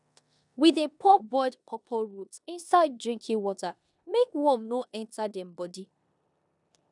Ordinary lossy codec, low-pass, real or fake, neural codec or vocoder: none; 10.8 kHz; fake; codec, 16 kHz in and 24 kHz out, 0.9 kbps, LongCat-Audio-Codec, four codebook decoder